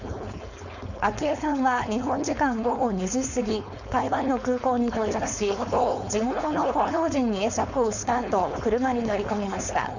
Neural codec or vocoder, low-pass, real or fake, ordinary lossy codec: codec, 16 kHz, 4.8 kbps, FACodec; 7.2 kHz; fake; none